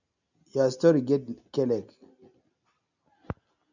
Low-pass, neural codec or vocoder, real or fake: 7.2 kHz; none; real